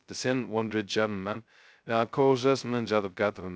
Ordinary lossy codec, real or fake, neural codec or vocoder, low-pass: none; fake; codec, 16 kHz, 0.2 kbps, FocalCodec; none